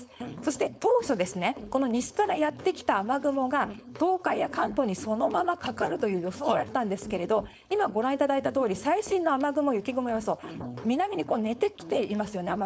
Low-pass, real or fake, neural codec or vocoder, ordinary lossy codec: none; fake; codec, 16 kHz, 4.8 kbps, FACodec; none